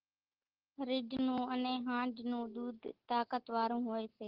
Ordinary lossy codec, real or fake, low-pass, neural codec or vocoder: Opus, 16 kbps; real; 5.4 kHz; none